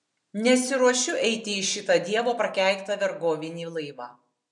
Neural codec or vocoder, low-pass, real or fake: none; 10.8 kHz; real